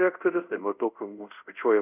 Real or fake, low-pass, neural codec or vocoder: fake; 3.6 kHz; codec, 24 kHz, 0.5 kbps, DualCodec